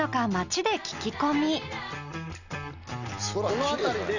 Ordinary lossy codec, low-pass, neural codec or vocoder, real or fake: none; 7.2 kHz; none; real